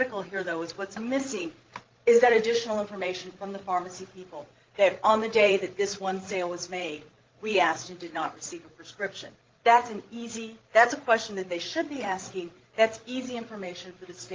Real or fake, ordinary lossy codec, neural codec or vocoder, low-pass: fake; Opus, 16 kbps; codec, 16 kHz, 16 kbps, FreqCodec, larger model; 7.2 kHz